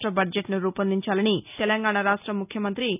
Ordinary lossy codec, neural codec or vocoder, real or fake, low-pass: none; none; real; 3.6 kHz